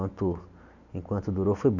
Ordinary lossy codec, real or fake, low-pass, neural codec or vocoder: none; real; 7.2 kHz; none